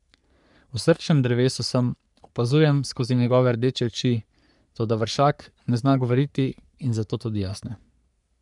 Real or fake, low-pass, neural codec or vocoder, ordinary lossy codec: fake; 10.8 kHz; codec, 44.1 kHz, 3.4 kbps, Pupu-Codec; none